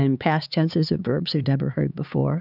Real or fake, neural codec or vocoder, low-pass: fake; codec, 16 kHz, 4 kbps, X-Codec, HuBERT features, trained on LibriSpeech; 5.4 kHz